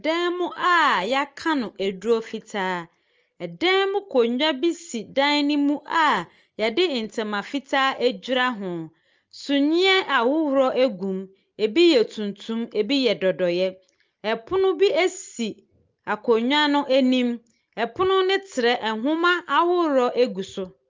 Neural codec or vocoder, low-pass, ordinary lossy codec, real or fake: none; 7.2 kHz; Opus, 24 kbps; real